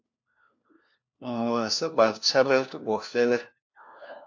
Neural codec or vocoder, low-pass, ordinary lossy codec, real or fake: codec, 16 kHz, 1 kbps, FunCodec, trained on LibriTTS, 50 frames a second; 7.2 kHz; AAC, 48 kbps; fake